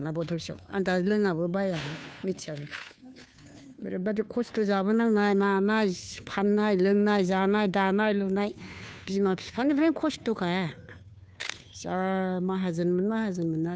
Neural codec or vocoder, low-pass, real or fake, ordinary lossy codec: codec, 16 kHz, 2 kbps, FunCodec, trained on Chinese and English, 25 frames a second; none; fake; none